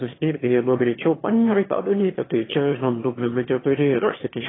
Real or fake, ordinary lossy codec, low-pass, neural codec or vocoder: fake; AAC, 16 kbps; 7.2 kHz; autoencoder, 22.05 kHz, a latent of 192 numbers a frame, VITS, trained on one speaker